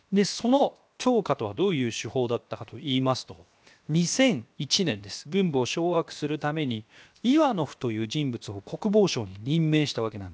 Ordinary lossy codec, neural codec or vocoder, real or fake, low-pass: none; codec, 16 kHz, 0.7 kbps, FocalCodec; fake; none